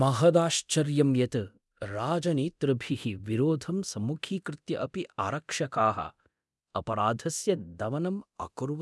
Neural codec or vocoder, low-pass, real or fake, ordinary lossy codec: codec, 24 kHz, 0.9 kbps, DualCodec; none; fake; none